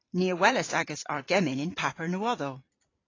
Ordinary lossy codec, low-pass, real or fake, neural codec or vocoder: AAC, 32 kbps; 7.2 kHz; fake; vocoder, 44.1 kHz, 128 mel bands every 512 samples, BigVGAN v2